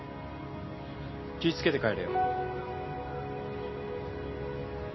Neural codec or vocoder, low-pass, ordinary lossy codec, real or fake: none; 7.2 kHz; MP3, 24 kbps; real